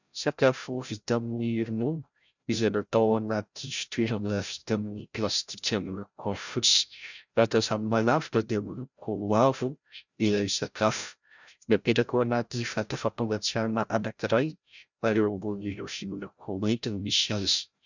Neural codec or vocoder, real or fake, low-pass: codec, 16 kHz, 0.5 kbps, FreqCodec, larger model; fake; 7.2 kHz